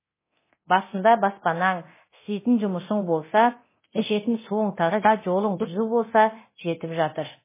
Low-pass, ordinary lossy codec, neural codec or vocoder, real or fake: 3.6 kHz; MP3, 16 kbps; codec, 24 kHz, 0.9 kbps, DualCodec; fake